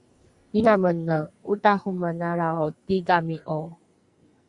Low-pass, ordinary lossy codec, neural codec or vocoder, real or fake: 10.8 kHz; Opus, 64 kbps; codec, 44.1 kHz, 2.6 kbps, SNAC; fake